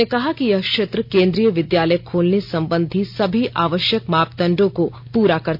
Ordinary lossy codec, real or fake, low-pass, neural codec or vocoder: none; real; 5.4 kHz; none